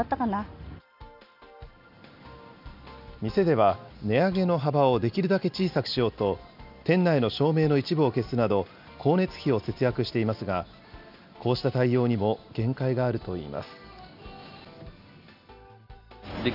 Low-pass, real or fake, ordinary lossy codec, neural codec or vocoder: 5.4 kHz; real; none; none